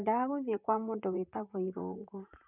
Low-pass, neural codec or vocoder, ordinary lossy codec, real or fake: 3.6 kHz; vocoder, 44.1 kHz, 128 mel bands, Pupu-Vocoder; none; fake